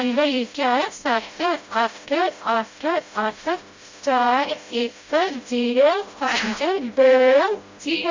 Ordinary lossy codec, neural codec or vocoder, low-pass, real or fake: MP3, 48 kbps; codec, 16 kHz, 0.5 kbps, FreqCodec, smaller model; 7.2 kHz; fake